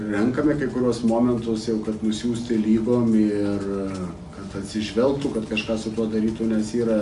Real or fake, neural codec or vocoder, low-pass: real; none; 10.8 kHz